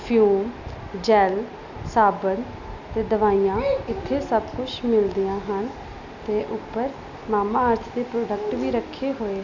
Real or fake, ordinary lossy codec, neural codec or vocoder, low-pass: real; none; none; 7.2 kHz